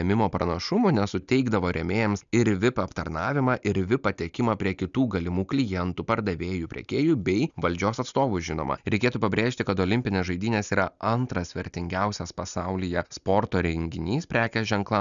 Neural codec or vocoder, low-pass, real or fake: none; 7.2 kHz; real